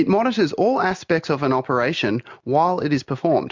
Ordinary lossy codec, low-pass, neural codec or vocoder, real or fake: MP3, 64 kbps; 7.2 kHz; none; real